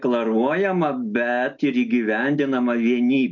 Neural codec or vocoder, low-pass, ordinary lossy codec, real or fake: none; 7.2 kHz; MP3, 48 kbps; real